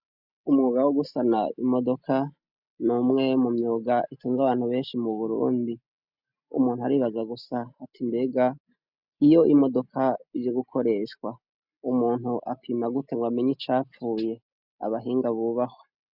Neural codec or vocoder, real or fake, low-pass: none; real; 5.4 kHz